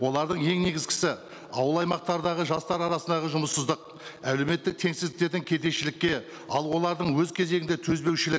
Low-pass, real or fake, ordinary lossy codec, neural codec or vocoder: none; real; none; none